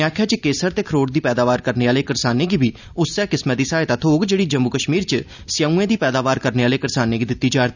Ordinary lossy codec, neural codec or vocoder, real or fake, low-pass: none; none; real; 7.2 kHz